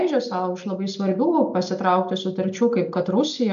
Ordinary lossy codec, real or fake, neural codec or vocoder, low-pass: AAC, 64 kbps; real; none; 7.2 kHz